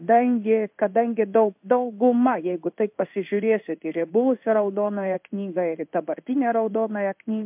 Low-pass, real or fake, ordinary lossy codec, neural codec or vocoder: 3.6 kHz; fake; MP3, 32 kbps; codec, 16 kHz in and 24 kHz out, 1 kbps, XY-Tokenizer